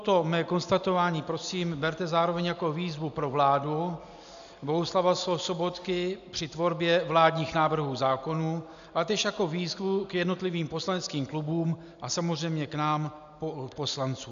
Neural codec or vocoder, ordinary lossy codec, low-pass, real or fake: none; AAC, 96 kbps; 7.2 kHz; real